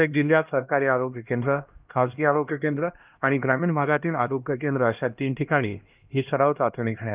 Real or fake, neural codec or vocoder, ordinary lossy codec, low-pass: fake; codec, 16 kHz, 1 kbps, X-Codec, HuBERT features, trained on LibriSpeech; Opus, 24 kbps; 3.6 kHz